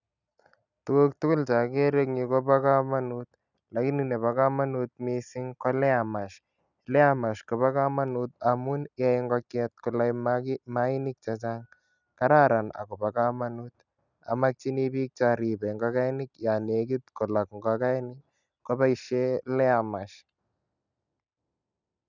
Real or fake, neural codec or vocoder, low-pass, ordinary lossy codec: real; none; 7.2 kHz; none